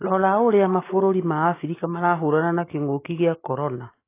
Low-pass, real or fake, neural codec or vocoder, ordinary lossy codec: 3.6 kHz; real; none; AAC, 24 kbps